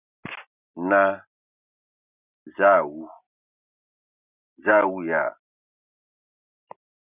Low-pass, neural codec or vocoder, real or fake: 3.6 kHz; none; real